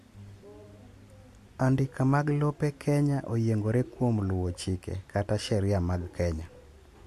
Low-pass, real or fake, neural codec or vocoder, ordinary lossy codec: 14.4 kHz; real; none; MP3, 64 kbps